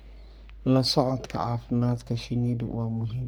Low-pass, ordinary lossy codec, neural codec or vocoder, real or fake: none; none; codec, 44.1 kHz, 3.4 kbps, Pupu-Codec; fake